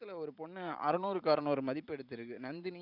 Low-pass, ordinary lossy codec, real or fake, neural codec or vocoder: 5.4 kHz; none; fake; vocoder, 44.1 kHz, 128 mel bands every 512 samples, BigVGAN v2